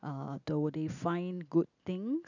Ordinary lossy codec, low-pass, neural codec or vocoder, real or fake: none; 7.2 kHz; none; real